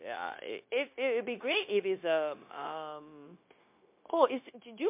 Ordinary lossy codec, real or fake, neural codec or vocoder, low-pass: MP3, 32 kbps; fake; codec, 16 kHz, 0.9 kbps, LongCat-Audio-Codec; 3.6 kHz